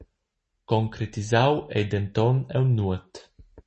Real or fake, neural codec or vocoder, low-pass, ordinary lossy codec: real; none; 10.8 kHz; MP3, 32 kbps